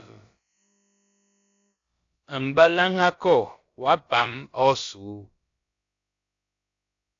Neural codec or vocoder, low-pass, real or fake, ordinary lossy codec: codec, 16 kHz, about 1 kbps, DyCAST, with the encoder's durations; 7.2 kHz; fake; AAC, 48 kbps